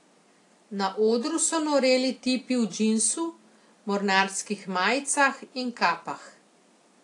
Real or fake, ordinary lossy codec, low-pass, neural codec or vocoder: real; AAC, 48 kbps; 10.8 kHz; none